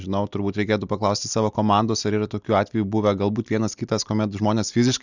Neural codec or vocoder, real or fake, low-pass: none; real; 7.2 kHz